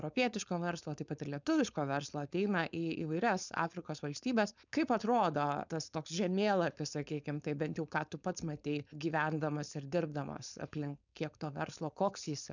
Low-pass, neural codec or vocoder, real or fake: 7.2 kHz; codec, 16 kHz, 4.8 kbps, FACodec; fake